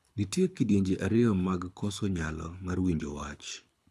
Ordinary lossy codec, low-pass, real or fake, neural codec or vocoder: none; none; fake; codec, 24 kHz, 6 kbps, HILCodec